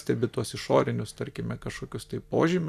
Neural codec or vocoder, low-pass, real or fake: vocoder, 44.1 kHz, 128 mel bands every 256 samples, BigVGAN v2; 14.4 kHz; fake